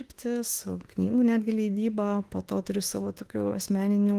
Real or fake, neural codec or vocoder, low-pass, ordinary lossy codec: fake; autoencoder, 48 kHz, 32 numbers a frame, DAC-VAE, trained on Japanese speech; 14.4 kHz; Opus, 24 kbps